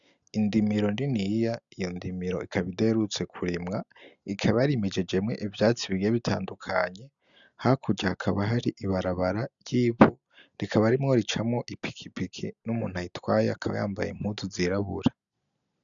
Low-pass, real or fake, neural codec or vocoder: 7.2 kHz; real; none